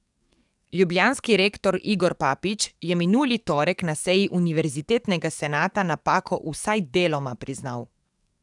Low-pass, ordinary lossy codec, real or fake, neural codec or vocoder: 10.8 kHz; none; fake; codec, 44.1 kHz, 7.8 kbps, DAC